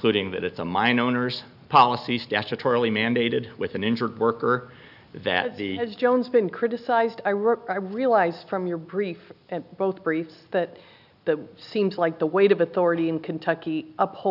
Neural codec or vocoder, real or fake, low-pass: none; real; 5.4 kHz